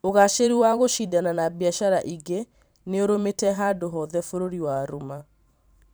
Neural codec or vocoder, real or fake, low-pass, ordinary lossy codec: vocoder, 44.1 kHz, 128 mel bands every 512 samples, BigVGAN v2; fake; none; none